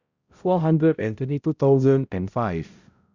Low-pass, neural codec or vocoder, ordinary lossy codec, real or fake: 7.2 kHz; codec, 16 kHz, 0.5 kbps, X-Codec, HuBERT features, trained on balanced general audio; Opus, 64 kbps; fake